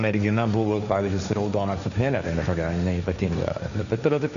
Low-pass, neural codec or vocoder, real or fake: 7.2 kHz; codec, 16 kHz, 1.1 kbps, Voila-Tokenizer; fake